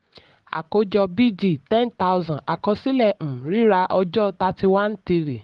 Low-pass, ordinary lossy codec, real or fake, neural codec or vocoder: 10.8 kHz; Opus, 24 kbps; fake; vocoder, 24 kHz, 100 mel bands, Vocos